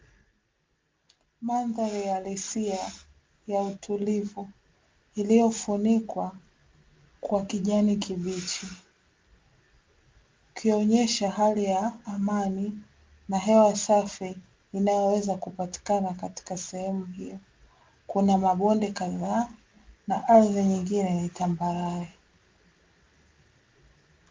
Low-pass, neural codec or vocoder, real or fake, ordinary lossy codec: 7.2 kHz; none; real; Opus, 32 kbps